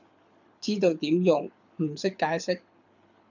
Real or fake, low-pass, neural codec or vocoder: fake; 7.2 kHz; codec, 24 kHz, 6 kbps, HILCodec